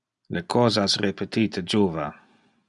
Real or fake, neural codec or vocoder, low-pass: real; none; 10.8 kHz